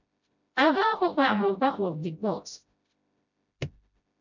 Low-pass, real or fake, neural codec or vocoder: 7.2 kHz; fake; codec, 16 kHz, 0.5 kbps, FreqCodec, smaller model